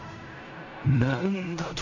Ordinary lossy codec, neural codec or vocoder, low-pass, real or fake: AAC, 48 kbps; codec, 16 kHz in and 24 kHz out, 0.4 kbps, LongCat-Audio-Codec, fine tuned four codebook decoder; 7.2 kHz; fake